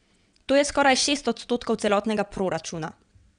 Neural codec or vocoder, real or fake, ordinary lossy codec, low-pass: vocoder, 22.05 kHz, 80 mel bands, WaveNeXt; fake; none; 9.9 kHz